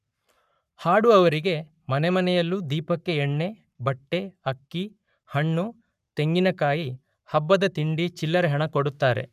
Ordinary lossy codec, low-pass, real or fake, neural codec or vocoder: none; 14.4 kHz; fake; codec, 44.1 kHz, 7.8 kbps, Pupu-Codec